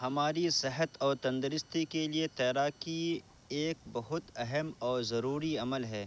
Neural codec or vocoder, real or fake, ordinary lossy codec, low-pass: none; real; none; none